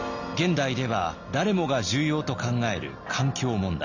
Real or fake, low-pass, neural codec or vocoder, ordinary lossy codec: real; 7.2 kHz; none; none